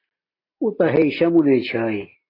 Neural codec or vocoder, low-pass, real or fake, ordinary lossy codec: none; 5.4 kHz; real; AAC, 24 kbps